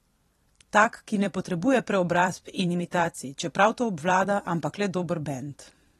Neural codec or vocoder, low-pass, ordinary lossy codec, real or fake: vocoder, 44.1 kHz, 128 mel bands every 256 samples, BigVGAN v2; 19.8 kHz; AAC, 32 kbps; fake